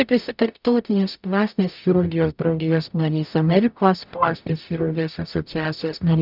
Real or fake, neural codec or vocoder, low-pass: fake; codec, 44.1 kHz, 0.9 kbps, DAC; 5.4 kHz